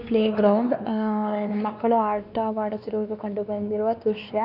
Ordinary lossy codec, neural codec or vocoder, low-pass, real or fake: none; codec, 16 kHz, 2 kbps, X-Codec, WavLM features, trained on Multilingual LibriSpeech; 5.4 kHz; fake